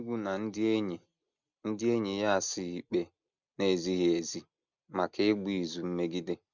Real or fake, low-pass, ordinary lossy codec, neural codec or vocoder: real; 7.2 kHz; none; none